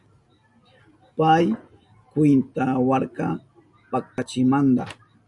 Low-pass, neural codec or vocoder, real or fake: 10.8 kHz; none; real